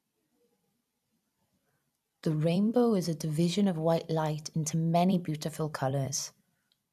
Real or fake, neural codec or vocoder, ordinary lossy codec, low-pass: fake; vocoder, 44.1 kHz, 128 mel bands every 256 samples, BigVGAN v2; none; 14.4 kHz